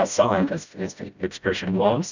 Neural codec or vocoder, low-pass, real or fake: codec, 16 kHz, 0.5 kbps, FreqCodec, smaller model; 7.2 kHz; fake